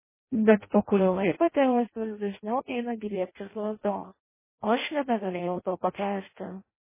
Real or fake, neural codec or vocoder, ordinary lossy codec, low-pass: fake; codec, 16 kHz in and 24 kHz out, 0.6 kbps, FireRedTTS-2 codec; MP3, 16 kbps; 3.6 kHz